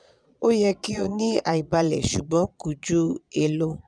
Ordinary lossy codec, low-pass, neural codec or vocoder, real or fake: none; 9.9 kHz; vocoder, 22.05 kHz, 80 mel bands, Vocos; fake